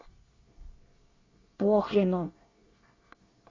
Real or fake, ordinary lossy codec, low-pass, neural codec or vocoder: fake; AAC, 32 kbps; 7.2 kHz; codec, 24 kHz, 1 kbps, SNAC